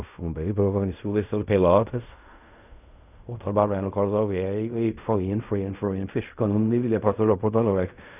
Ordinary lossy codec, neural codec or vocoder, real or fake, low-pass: none; codec, 16 kHz in and 24 kHz out, 0.4 kbps, LongCat-Audio-Codec, fine tuned four codebook decoder; fake; 3.6 kHz